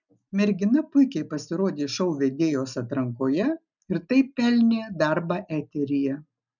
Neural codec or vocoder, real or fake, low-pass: none; real; 7.2 kHz